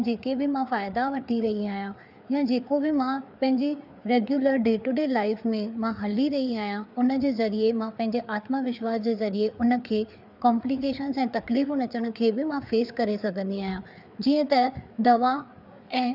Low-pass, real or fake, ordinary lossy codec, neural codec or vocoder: 5.4 kHz; fake; none; codec, 16 kHz, 4 kbps, FreqCodec, larger model